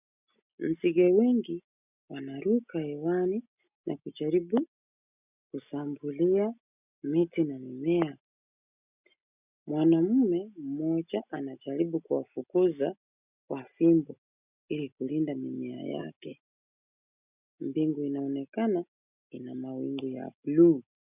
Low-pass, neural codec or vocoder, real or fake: 3.6 kHz; none; real